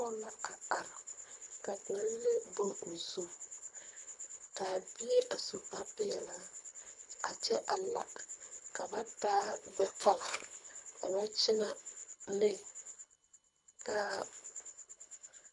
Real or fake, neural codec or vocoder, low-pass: fake; codec, 24 kHz, 3 kbps, HILCodec; 10.8 kHz